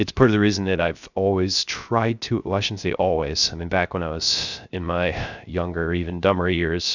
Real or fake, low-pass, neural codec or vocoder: fake; 7.2 kHz; codec, 16 kHz, 0.3 kbps, FocalCodec